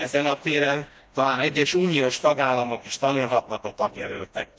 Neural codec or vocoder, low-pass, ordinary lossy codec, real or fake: codec, 16 kHz, 1 kbps, FreqCodec, smaller model; none; none; fake